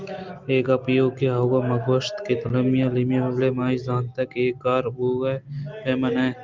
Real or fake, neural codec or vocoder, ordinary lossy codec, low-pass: real; none; Opus, 24 kbps; 7.2 kHz